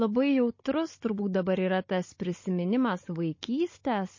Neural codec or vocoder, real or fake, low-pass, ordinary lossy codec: codec, 16 kHz, 16 kbps, FunCodec, trained on Chinese and English, 50 frames a second; fake; 7.2 kHz; MP3, 32 kbps